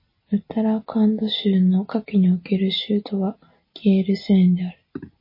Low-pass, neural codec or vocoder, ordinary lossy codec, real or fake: 5.4 kHz; none; MP3, 24 kbps; real